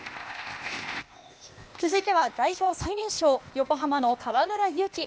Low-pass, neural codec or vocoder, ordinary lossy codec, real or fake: none; codec, 16 kHz, 0.8 kbps, ZipCodec; none; fake